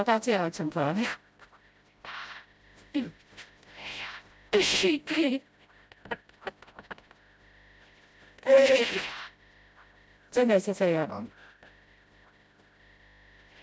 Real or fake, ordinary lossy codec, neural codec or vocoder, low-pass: fake; none; codec, 16 kHz, 0.5 kbps, FreqCodec, smaller model; none